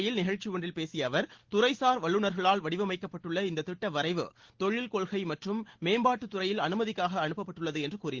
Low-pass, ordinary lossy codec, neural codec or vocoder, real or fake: 7.2 kHz; Opus, 16 kbps; none; real